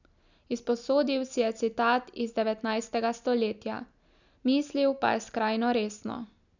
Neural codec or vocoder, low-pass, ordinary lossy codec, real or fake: none; 7.2 kHz; none; real